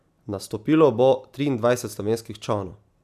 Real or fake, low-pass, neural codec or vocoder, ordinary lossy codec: real; 14.4 kHz; none; none